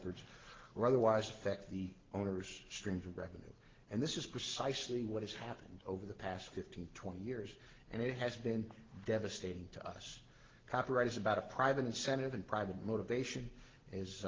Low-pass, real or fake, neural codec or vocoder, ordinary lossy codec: 7.2 kHz; real; none; Opus, 16 kbps